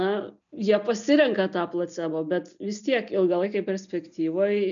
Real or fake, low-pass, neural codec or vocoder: real; 7.2 kHz; none